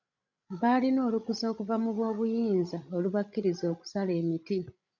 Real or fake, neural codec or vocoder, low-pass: fake; codec, 16 kHz, 16 kbps, FreqCodec, larger model; 7.2 kHz